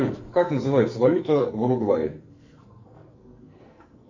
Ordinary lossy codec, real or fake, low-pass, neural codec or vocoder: Opus, 64 kbps; fake; 7.2 kHz; codec, 44.1 kHz, 2.6 kbps, SNAC